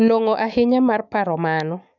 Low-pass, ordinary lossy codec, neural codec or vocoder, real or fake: 7.2 kHz; none; autoencoder, 48 kHz, 128 numbers a frame, DAC-VAE, trained on Japanese speech; fake